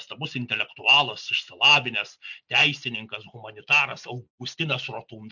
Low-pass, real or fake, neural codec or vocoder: 7.2 kHz; real; none